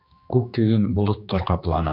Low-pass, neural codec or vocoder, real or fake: 5.4 kHz; codec, 16 kHz, 2 kbps, X-Codec, HuBERT features, trained on general audio; fake